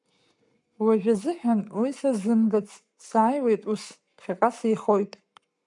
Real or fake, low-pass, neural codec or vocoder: fake; 10.8 kHz; codec, 44.1 kHz, 7.8 kbps, Pupu-Codec